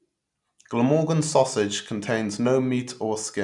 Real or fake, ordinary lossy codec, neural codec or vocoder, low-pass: real; none; none; 10.8 kHz